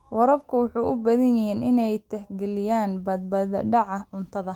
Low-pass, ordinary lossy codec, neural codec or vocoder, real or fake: 19.8 kHz; Opus, 24 kbps; autoencoder, 48 kHz, 128 numbers a frame, DAC-VAE, trained on Japanese speech; fake